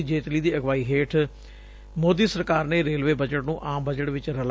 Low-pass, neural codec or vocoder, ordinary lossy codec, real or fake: none; none; none; real